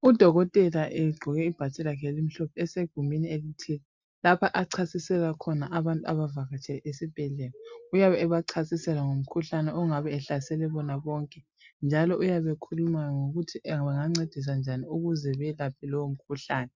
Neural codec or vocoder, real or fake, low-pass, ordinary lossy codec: none; real; 7.2 kHz; MP3, 64 kbps